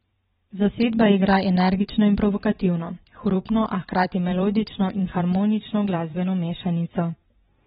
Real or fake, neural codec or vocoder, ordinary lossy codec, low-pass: fake; vocoder, 44.1 kHz, 128 mel bands every 512 samples, BigVGAN v2; AAC, 16 kbps; 19.8 kHz